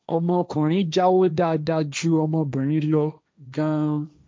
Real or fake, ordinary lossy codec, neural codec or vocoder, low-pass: fake; none; codec, 16 kHz, 1.1 kbps, Voila-Tokenizer; none